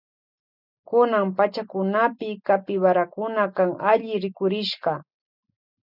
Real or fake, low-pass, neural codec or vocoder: real; 5.4 kHz; none